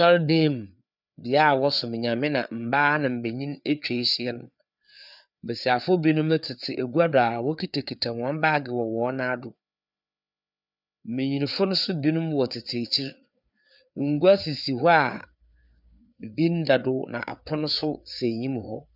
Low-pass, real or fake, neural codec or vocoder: 5.4 kHz; fake; codec, 16 kHz, 4 kbps, FreqCodec, larger model